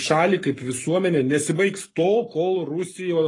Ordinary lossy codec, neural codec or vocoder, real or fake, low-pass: AAC, 32 kbps; codec, 44.1 kHz, 7.8 kbps, Pupu-Codec; fake; 10.8 kHz